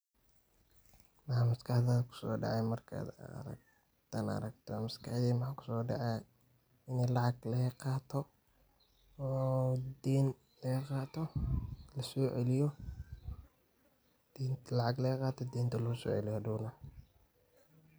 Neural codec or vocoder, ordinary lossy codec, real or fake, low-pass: none; none; real; none